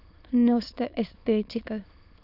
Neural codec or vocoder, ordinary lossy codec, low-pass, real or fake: autoencoder, 22.05 kHz, a latent of 192 numbers a frame, VITS, trained on many speakers; none; 5.4 kHz; fake